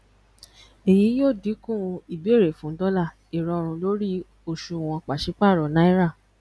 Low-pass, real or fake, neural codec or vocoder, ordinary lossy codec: none; real; none; none